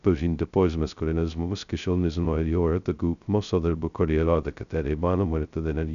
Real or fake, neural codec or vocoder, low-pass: fake; codec, 16 kHz, 0.2 kbps, FocalCodec; 7.2 kHz